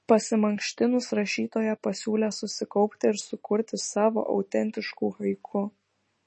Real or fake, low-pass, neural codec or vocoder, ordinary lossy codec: real; 10.8 kHz; none; MP3, 32 kbps